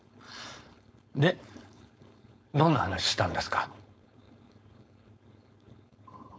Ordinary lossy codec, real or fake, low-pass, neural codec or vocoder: none; fake; none; codec, 16 kHz, 4.8 kbps, FACodec